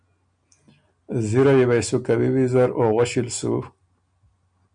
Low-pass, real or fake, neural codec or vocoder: 9.9 kHz; real; none